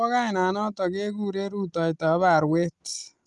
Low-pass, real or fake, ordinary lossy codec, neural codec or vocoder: 10.8 kHz; real; Opus, 32 kbps; none